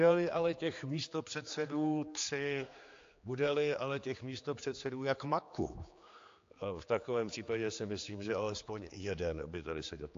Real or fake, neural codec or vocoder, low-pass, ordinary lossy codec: fake; codec, 16 kHz, 4 kbps, X-Codec, HuBERT features, trained on general audio; 7.2 kHz; AAC, 48 kbps